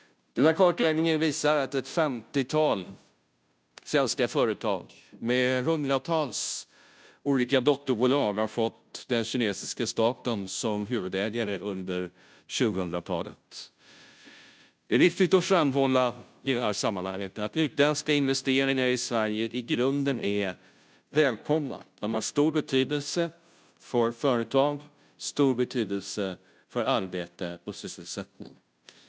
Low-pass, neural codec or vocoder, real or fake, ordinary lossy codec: none; codec, 16 kHz, 0.5 kbps, FunCodec, trained on Chinese and English, 25 frames a second; fake; none